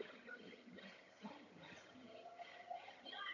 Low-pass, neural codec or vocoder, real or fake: 7.2 kHz; vocoder, 22.05 kHz, 80 mel bands, HiFi-GAN; fake